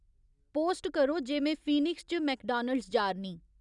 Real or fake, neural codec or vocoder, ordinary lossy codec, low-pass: real; none; none; 10.8 kHz